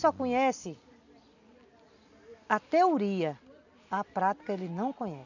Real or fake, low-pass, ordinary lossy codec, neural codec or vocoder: real; 7.2 kHz; none; none